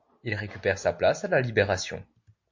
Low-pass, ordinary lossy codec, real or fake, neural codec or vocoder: 7.2 kHz; MP3, 48 kbps; real; none